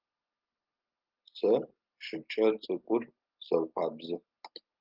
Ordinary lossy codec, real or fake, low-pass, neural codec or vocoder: Opus, 32 kbps; real; 5.4 kHz; none